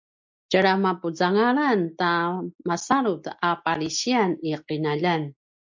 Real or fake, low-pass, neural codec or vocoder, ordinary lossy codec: real; 7.2 kHz; none; MP3, 64 kbps